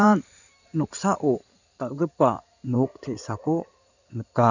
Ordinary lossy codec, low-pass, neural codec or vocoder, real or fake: none; 7.2 kHz; codec, 16 kHz in and 24 kHz out, 2.2 kbps, FireRedTTS-2 codec; fake